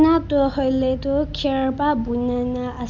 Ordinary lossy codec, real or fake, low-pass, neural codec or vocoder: none; real; 7.2 kHz; none